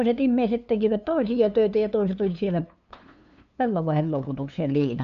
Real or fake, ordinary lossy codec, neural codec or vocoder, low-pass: fake; none; codec, 16 kHz, 2 kbps, FunCodec, trained on LibriTTS, 25 frames a second; 7.2 kHz